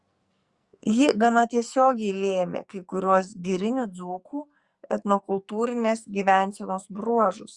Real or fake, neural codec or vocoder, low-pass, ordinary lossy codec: fake; codec, 44.1 kHz, 2.6 kbps, SNAC; 10.8 kHz; Opus, 64 kbps